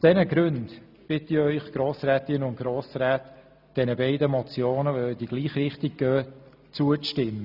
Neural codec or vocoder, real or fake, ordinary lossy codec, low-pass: none; real; none; 5.4 kHz